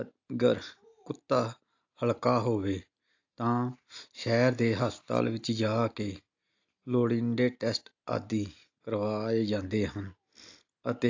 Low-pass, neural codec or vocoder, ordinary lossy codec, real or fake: 7.2 kHz; none; AAC, 48 kbps; real